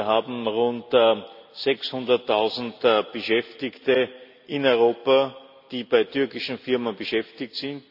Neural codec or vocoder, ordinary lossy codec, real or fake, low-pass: none; none; real; 5.4 kHz